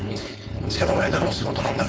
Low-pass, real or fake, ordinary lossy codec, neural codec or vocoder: none; fake; none; codec, 16 kHz, 4.8 kbps, FACodec